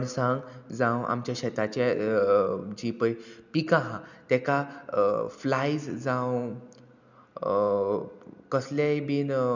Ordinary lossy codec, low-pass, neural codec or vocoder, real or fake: none; 7.2 kHz; none; real